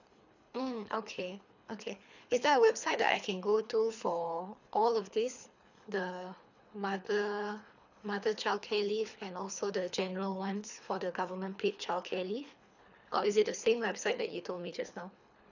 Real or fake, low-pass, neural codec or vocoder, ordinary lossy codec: fake; 7.2 kHz; codec, 24 kHz, 3 kbps, HILCodec; none